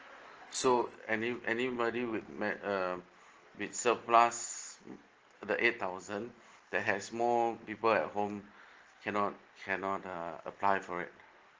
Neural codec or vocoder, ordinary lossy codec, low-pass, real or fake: none; Opus, 16 kbps; 7.2 kHz; real